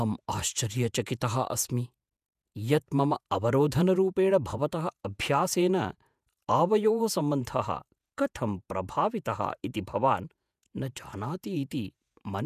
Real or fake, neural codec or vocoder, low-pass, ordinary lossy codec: fake; vocoder, 48 kHz, 128 mel bands, Vocos; 14.4 kHz; none